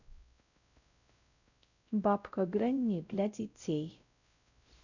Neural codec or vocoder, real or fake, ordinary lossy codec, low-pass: codec, 16 kHz, 0.5 kbps, X-Codec, WavLM features, trained on Multilingual LibriSpeech; fake; none; 7.2 kHz